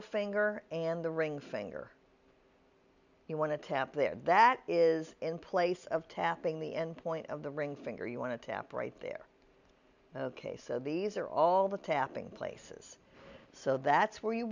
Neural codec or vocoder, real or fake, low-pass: none; real; 7.2 kHz